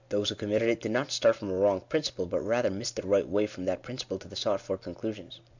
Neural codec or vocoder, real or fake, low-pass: none; real; 7.2 kHz